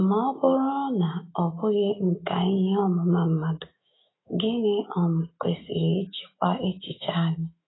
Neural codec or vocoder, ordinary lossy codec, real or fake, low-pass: autoencoder, 48 kHz, 128 numbers a frame, DAC-VAE, trained on Japanese speech; AAC, 16 kbps; fake; 7.2 kHz